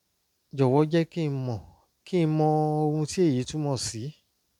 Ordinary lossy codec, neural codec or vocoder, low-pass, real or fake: none; none; 19.8 kHz; real